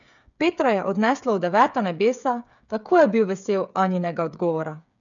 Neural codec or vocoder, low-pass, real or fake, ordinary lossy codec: codec, 16 kHz, 8 kbps, FreqCodec, smaller model; 7.2 kHz; fake; none